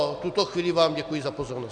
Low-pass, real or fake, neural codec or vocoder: 9.9 kHz; real; none